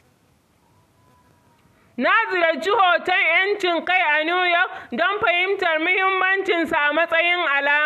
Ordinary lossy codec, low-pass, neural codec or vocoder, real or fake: none; 14.4 kHz; none; real